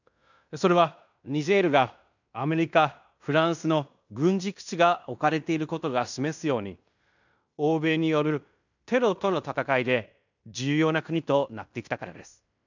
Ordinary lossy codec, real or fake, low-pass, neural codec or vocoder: none; fake; 7.2 kHz; codec, 16 kHz in and 24 kHz out, 0.9 kbps, LongCat-Audio-Codec, fine tuned four codebook decoder